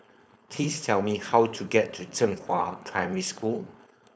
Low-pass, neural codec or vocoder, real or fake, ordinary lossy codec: none; codec, 16 kHz, 4.8 kbps, FACodec; fake; none